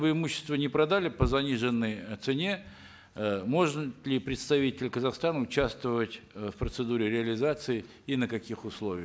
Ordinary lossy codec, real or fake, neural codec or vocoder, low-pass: none; real; none; none